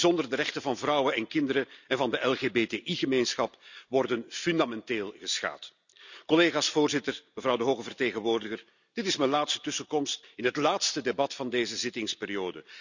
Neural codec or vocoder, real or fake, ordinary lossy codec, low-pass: none; real; none; 7.2 kHz